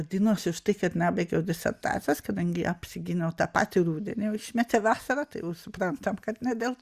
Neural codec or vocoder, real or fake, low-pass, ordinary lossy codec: none; real; 14.4 kHz; Opus, 64 kbps